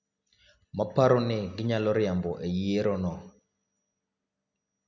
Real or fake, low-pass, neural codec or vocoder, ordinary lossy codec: real; 7.2 kHz; none; none